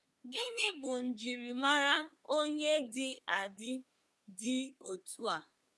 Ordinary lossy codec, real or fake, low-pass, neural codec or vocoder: none; fake; none; codec, 24 kHz, 1 kbps, SNAC